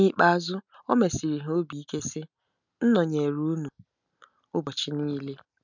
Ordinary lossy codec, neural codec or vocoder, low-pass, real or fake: none; none; 7.2 kHz; real